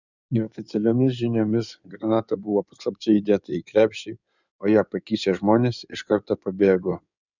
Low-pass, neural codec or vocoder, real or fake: 7.2 kHz; codec, 16 kHz in and 24 kHz out, 2.2 kbps, FireRedTTS-2 codec; fake